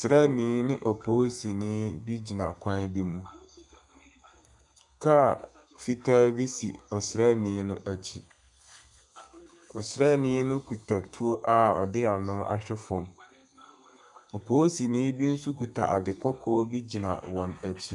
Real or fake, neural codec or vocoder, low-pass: fake; codec, 32 kHz, 1.9 kbps, SNAC; 10.8 kHz